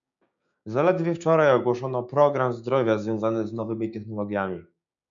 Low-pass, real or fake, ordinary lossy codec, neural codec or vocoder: 7.2 kHz; fake; MP3, 96 kbps; codec, 16 kHz, 6 kbps, DAC